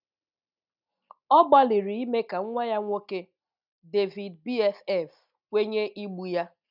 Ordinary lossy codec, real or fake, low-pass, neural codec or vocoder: none; real; 5.4 kHz; none